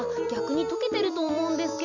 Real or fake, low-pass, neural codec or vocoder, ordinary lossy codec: real; 7.2 kHz; none; none